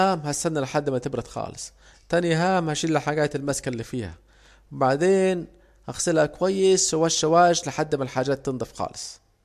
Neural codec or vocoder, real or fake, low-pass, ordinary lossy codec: none; real; 14.4 kHz; MP3, 64 kbps